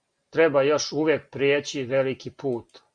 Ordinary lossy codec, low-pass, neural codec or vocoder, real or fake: Opus, 64 kbps; 9.9 kHz; none; real